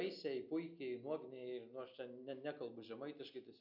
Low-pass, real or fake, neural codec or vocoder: 5.4 kHz; real; none